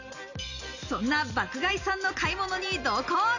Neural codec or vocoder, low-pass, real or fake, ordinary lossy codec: none; 7.2 kHz; real; none